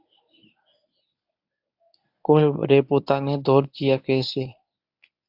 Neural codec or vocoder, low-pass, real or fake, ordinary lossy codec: codec, 24 kHz, 0.9 kbps, WavTokenizer, medium speech release version 1; 5.4 kHz; fake; AAC, 48 kbps